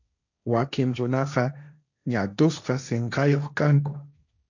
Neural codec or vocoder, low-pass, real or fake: codec, 16 kHz, 1.1 kbps, Voila-Tokenizer; 7.2 kHz; fake